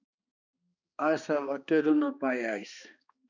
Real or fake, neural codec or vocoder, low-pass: fake; codec, 16 kHz, 2 kbps, X-Codec, HuBERT features, trained on balanced general audio; 7.2 kHz